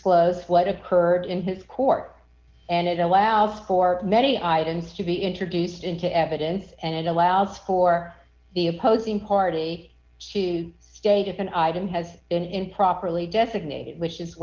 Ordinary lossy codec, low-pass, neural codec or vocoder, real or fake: Opus, 16 kbps; 7.2 kHz; none; real